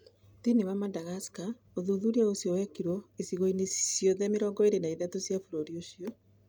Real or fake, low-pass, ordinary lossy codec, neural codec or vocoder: real; none; none; none